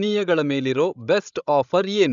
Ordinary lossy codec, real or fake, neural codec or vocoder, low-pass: none; fake; codec, 16 kHz, 16 kbps, FreqCodec, larger model; 7.2 kHz